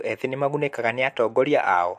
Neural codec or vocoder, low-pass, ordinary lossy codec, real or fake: none; 14.4 kHz; MP3, 64 kbps; real